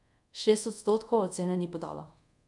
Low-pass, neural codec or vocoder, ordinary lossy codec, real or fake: 10.8 kHz; codec, 24 kHz, 0.5 kbps, DualCodec; none; fake